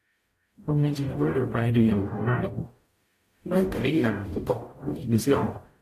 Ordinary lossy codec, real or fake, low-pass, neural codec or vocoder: AAC, 64 kbps; fake; 14.4 kHz; codec, 44.1 kHz, 0.9 kbps, DAC